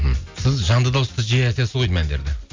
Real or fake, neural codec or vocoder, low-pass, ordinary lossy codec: real; none; 7.2 kHz; MP3, 64 kbps